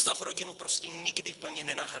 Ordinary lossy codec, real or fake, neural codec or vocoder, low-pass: Opus, 64 kbps; fake; codec, 24 kHz, 3 kbps, HILCodec; 10.8 kHz